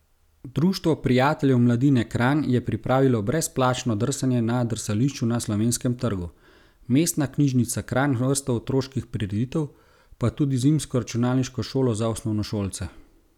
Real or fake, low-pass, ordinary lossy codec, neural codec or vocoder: real; 19.8 kHz; none; none